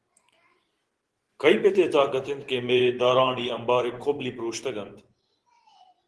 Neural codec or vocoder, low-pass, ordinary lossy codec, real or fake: vocoder, 24 kHz, 100 mel bands, Vocos; 10.8 kHz; Opus, 16 kbps; fake